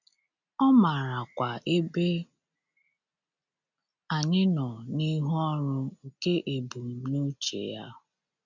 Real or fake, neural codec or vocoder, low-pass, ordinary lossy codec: real; none; 7.2 kHz; none